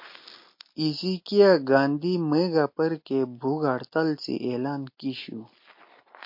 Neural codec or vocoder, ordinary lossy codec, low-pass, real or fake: none; MP3, 32 kbps; 5.4 kHz; real